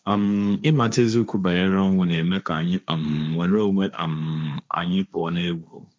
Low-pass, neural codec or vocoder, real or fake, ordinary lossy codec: none; codec, 16 kHz, 1.1 kbps, Voila-Tokenizer; fake; none